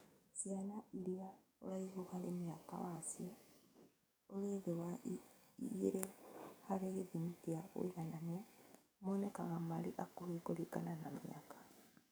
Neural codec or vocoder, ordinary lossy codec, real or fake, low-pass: codec, 44.1 kHz, 7.8 kbps, DAC; none; fake; none